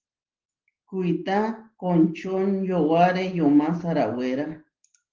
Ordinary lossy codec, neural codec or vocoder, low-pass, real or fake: Opus, 16 kbps; none; 7.2 kHz; real